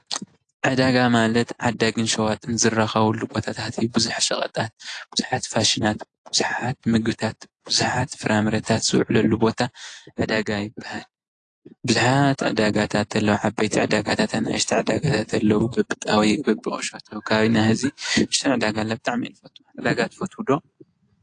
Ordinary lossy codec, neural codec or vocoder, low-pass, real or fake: AAC, 48 kbps; none; 9.9 kHz; real